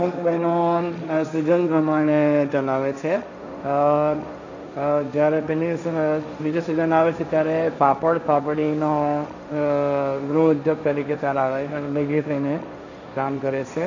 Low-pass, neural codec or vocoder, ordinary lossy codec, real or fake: 7.2 kHz; codec, 16 kHz, 1.1 kbps, Voila-Tokenizer; none; fake